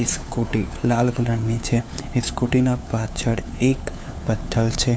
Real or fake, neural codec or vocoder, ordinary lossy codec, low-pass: fake; codec, 16 kHz, 4 kbps, FunCodec, trained on LibriTTS, 50 frames a second; none; none